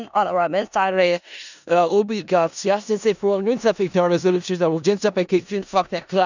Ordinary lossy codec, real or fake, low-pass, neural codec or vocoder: none; fake; 7.2 kHz; codec, 16 kHz in and 24 kHz out, 0.4 kbps, LongCat-Audio-Codec, four codebook decoder